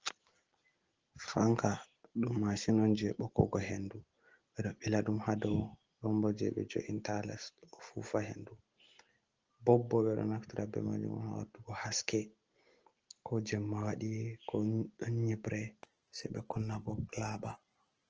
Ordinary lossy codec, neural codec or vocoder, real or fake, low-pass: Opus, 16 kbps; none; real; 7.2 kHz